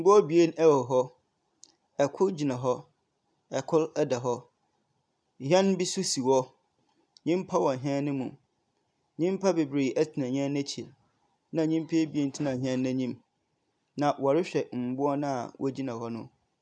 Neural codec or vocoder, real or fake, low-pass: none; real; 9.9 kHz